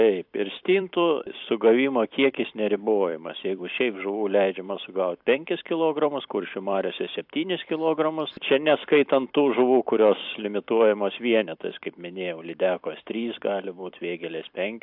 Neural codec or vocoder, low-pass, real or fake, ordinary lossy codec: vocoder, 44.1 kHz, 128 mel bands every 256 samples, BigVGAN v2; 5.4 kHz; fake; AAC, 48 kbps